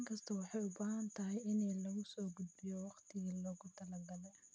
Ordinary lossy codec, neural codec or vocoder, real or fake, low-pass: none; none; real; none